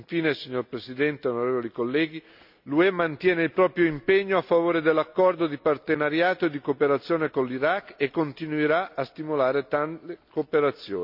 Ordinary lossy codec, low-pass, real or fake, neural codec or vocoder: none; 5.4 kHz; real; none